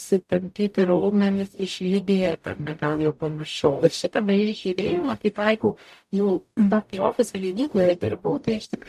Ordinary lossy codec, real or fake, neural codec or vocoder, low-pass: MP3, 96 kbps; fake; codec, 44.1 kHz, 0.9 kbps, DAC; 14.4 kHz